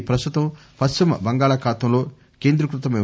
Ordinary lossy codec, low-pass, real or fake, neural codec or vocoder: none; none; real; none